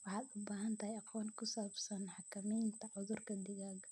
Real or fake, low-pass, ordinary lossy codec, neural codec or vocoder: real; none; none; none